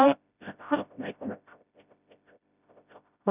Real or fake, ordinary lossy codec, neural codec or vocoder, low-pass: fake; none; codec, 16 kHz, 0.5 kbps, FreqCodec, smaller model; 3.6 kHz